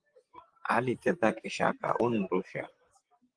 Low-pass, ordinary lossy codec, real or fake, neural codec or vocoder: 9.9 kHz; Opus, 24 kbps; fake; vocoder, 44.1 kHz, 128 mel bands, Pupu-Vocoder